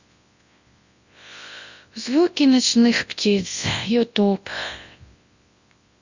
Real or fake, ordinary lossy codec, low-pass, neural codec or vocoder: fake; Opus, 64 kbps; 7.2 kHz; codec, 24 kHz, 0.9 kbps, WavTokenizer, large speech release